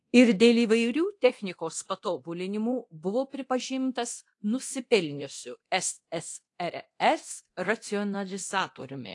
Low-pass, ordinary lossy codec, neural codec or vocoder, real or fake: 10.8 kHz; AAC, 48 kbps; codec, 24 kHz, 0.9 kbps, DualCodec; fake